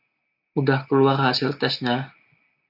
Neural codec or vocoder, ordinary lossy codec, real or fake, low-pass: none; AAC, 48 kbps; real; 5.4 kHz